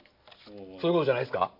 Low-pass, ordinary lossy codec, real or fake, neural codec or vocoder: 5.4 kHz; none; real; none